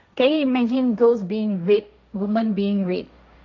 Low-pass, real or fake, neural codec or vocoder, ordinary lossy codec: 7.2 kHz; fake; codec, 16 kHz, 1.1 kbps, Voila-Tokenizer; MP3, 64 kbps